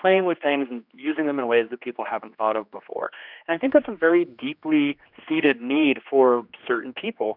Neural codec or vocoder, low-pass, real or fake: codec, 16 kHz, 2 kbps, X-Codec, HuBERT features, trained on general audio; 5.4 kHz; fake